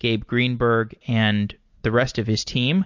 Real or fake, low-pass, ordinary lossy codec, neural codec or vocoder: real; 7.2 kHz; MP3, 48 kbps; none